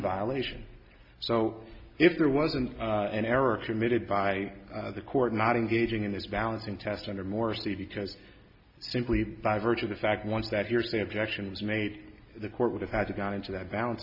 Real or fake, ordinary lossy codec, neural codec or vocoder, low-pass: real; AAC, 48 kbps; none; 5.4 kHz